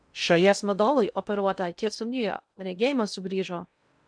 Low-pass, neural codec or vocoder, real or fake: 9.9 kHz; codec, 16 kHz in and 24 kHz out, 0.6 kbps, FocalCodec, streaming, 2048 codes; fake